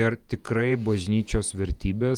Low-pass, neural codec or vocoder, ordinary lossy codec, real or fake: 19.8 kHz; vocoder, 48 kHz, 128 mel bands, Vocos; Opus, 32 kbps; fake